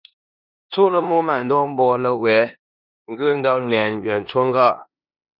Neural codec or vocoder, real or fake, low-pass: codec, 16 kHz in and 24 kHz out, 0.9 kbps, LongCat-Audio-Codec, four codebook decoder; fake; 5.4 kHz